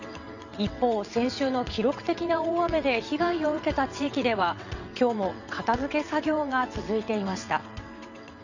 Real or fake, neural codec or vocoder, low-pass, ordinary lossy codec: fake; vocoder, 22.05 kHz, 80 mel bands, WaveNeXt; 7.2 kHz; none